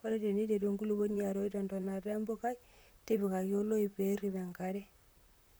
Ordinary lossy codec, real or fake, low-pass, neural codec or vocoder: none; fake; none; vocoder, 44.1 kHz, 128 mel bands, Pupu-Vocoder